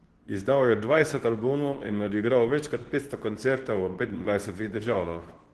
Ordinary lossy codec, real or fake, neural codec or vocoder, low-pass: Opus, 16 kbps; fake; codec, 24 kHz, 0.9 kbps, WavTokenizer, medium speech release version 2; 10.8 kHz